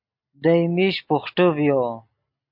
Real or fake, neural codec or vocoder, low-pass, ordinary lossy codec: real; none; 5.4 kHz; MP3, 48 kbps